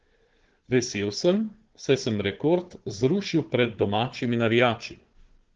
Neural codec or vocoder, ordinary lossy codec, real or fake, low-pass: codec, 16 kHz, 4 kbps, FunCodec, trained on Chinese and English, 50 frames a second; Opus, 16 kbps; fake; 7.2 kHz